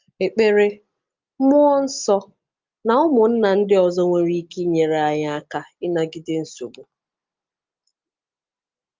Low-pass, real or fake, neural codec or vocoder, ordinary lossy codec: 7.2 kHz; real; none; Opus, 24 kbps